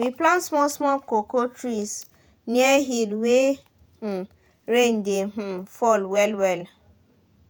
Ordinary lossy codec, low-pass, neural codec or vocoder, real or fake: none; none; vocoder, 48 kHz, 128 mel bands, Vocos; fake